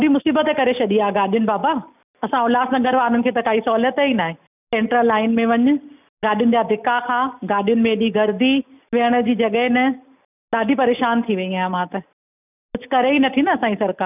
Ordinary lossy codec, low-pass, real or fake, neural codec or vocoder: none; 3.6 kHz; real; none